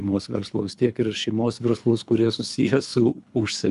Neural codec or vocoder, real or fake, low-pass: codec, 24 kHz, 3 kbps, HILCodec; fake; 10.8 kHz